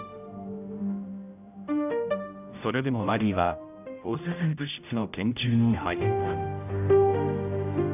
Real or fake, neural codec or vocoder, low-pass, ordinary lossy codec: fake; codec, 16 kHz, 0.5 kbps, X-Codec, HuBERT features, trained on general audio; 3.6 kHz; none